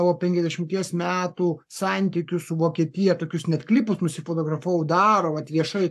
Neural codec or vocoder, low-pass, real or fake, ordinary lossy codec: codec, 44.1 kHz, 7.8 kbps, Pupu-Codec; 14.4 kHz; fake; AAC, 96 kbps